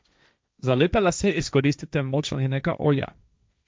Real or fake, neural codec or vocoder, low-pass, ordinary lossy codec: fake; codec, 16 kHz, 1.1 kbps, Voila-Tokenizer; none; none